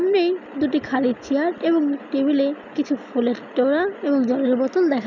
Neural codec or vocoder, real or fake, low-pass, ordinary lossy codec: none; real; 7.2 kHz; none